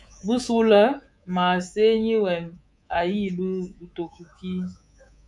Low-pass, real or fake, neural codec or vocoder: 10.8 kHz; fake; codec, 24 kHz, 3.1 kbps, DualCodec